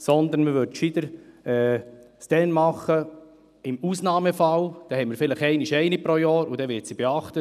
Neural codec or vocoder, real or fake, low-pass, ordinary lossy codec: none; real; 14.4 kHz; none